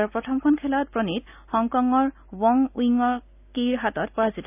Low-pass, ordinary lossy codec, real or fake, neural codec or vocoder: 3.6 kHz; none; real; none